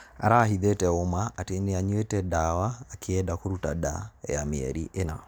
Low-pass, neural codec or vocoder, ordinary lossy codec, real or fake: none; none; none; real